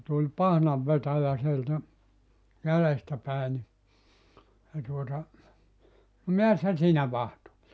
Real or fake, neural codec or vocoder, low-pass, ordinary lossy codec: real; none; none; none